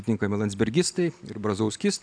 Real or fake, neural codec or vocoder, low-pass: real; none; 9.9 kHz